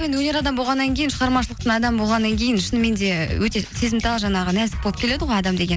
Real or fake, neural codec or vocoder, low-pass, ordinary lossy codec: real; none; none; none